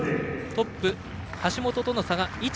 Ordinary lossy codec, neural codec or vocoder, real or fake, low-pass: none; none; real; none